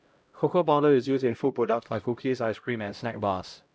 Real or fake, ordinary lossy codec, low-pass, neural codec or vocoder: fake; none; none; codec, 16 kHz, 0.5 kbps, X-Codec, HuBERT features, trained on LibriSpeech